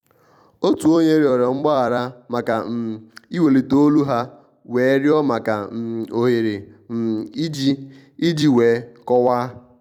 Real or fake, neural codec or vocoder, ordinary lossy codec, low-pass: fake; vocoder, 44.1 kHz, 128 mel bands every 256 samples, BigVGAN v2; none; 19.8 kHz